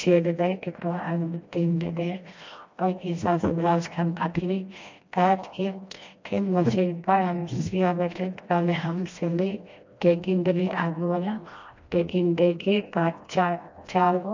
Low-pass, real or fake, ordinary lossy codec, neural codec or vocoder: 7.2 kHz; fake; MP3, 48 kbps; codec, 16 kHz, 1 kbps, FreqCodec, smaller model